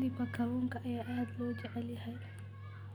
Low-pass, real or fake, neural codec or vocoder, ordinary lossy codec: 19.8 kHz; real; none; none